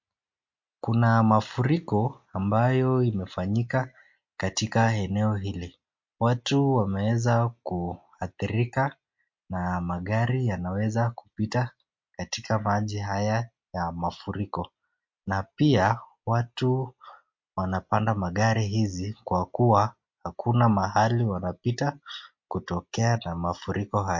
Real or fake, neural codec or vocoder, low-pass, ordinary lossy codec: real; none; 7.2 kHz; MP3, 48 kbps